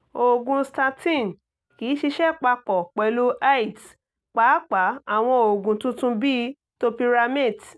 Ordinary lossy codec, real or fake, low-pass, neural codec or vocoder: none; real; none; none